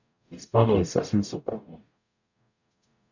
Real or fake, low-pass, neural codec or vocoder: fake; 7.2 kHz; codec, 44.1 kHz, 0.9 kbps, DAC